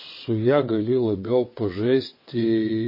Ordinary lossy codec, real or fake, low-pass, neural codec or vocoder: MP3, 24 kbps; fake; 5.4 kHz; vocoder, 22.05 kHz, 80 mel bands, WaveNeXt